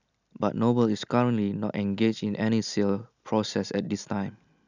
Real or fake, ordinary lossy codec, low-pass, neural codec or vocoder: real; none; 7.2 kHz; none